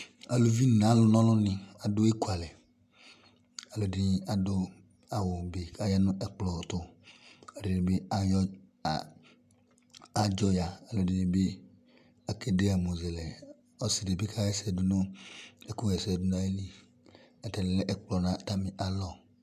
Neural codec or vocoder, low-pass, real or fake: none; 14.4 kHz; real